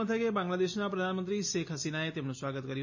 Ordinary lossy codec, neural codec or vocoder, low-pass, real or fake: MP3, 32 kbps; none; 7.2 kHz; real